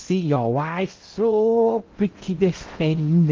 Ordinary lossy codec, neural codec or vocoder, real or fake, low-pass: Opus, 32 kbps; codec, 16 kHz in and 24 kHz out, 0.6 kbps, FocalCodec, streaming, 2048 codes; fake; 7.2 kHz